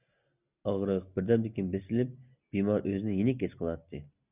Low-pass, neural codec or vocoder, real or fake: 3.6 kHz; none; real